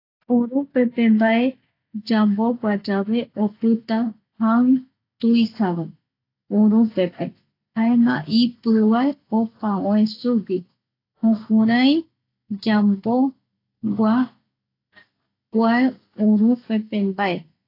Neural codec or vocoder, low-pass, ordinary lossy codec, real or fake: none; 5.4 kHz; AAC, 32 kbps; real